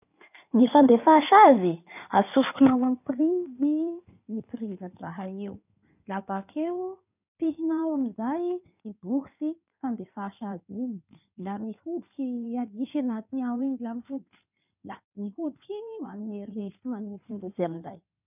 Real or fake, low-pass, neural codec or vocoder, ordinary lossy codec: fake; 3.6 kHz; codec, 16 kHz in and 24 kHz out, 2.2 kbps, FireRedTTS-2 codec; none